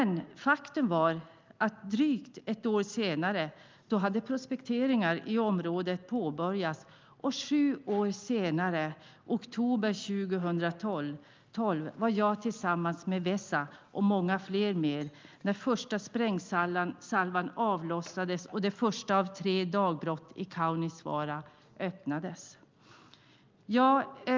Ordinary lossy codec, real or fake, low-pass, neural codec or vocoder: Opus, 24 kbps; real; 7.2 kHz; none